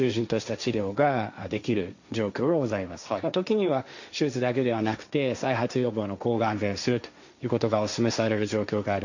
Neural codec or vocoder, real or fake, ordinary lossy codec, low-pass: codec, 16 kHz, 1.1 kbps, Voila-Tokenizer; fake; none; 7.2 kHz